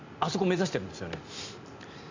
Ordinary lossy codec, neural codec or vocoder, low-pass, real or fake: MP3, 64 kbps; none; 7.2 kHz; real